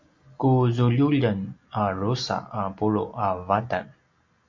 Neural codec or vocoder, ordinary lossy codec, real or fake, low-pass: none; MP3, 48 kbps; real; 7.2 kHz